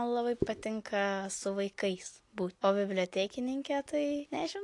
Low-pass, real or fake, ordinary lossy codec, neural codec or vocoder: 10.8 kHz; real; AAC, 48 kbps; none